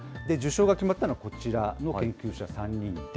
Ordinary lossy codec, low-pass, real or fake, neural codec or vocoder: none; none; real; none